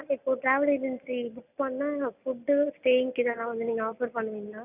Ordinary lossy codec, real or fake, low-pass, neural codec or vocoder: none; real; 3.6 kHz; none